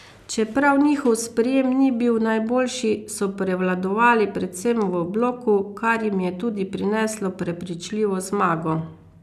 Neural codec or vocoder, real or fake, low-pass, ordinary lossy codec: none; real; 14.4 kHz; none